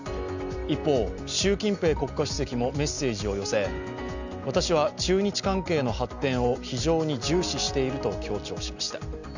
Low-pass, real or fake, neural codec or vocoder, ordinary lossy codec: 7.2 kHz; real; none; none